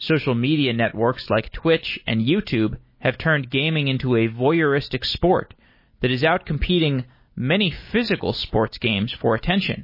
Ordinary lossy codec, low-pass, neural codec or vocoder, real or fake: MP3, 24 kbps; 5.4 kHz; none; real